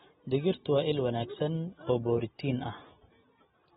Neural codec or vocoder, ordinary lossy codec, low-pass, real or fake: none; AAC, 16 kbps; 14.4 kHz; real